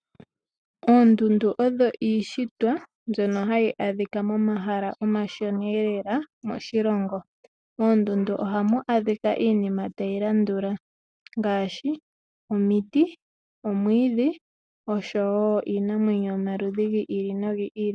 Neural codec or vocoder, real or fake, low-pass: none; real; 9.9 kHz